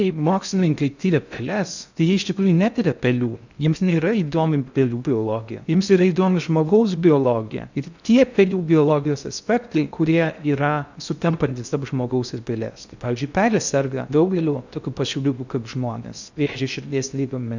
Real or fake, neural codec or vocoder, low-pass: fake; codec, 16 kHz in and 24 kHz out, 0.6 kbps, FocalCodec, streaming, 2048 codes; 7.2 kHz